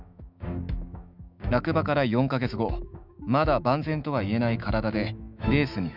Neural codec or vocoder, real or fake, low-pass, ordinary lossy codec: autoencoder, 48 kHz, 128 numbers a frame, DAC-VAE, trained on Japanese speech; fake; 5.4 kHz; none